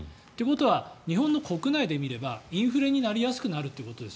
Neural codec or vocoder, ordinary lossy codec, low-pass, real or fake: none; none; none; real